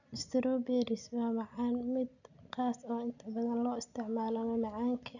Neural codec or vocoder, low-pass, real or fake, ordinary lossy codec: none; 7.2 kHz; real; none